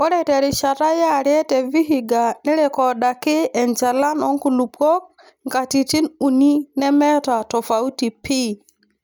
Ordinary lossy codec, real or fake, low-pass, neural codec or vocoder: none; real; none; none